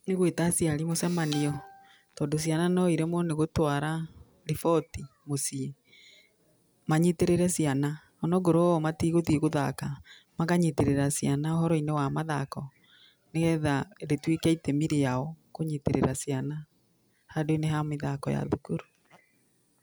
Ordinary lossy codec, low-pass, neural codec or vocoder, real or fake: none; none; none; real